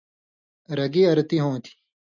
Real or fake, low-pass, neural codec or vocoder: real; 7.2 kHz; none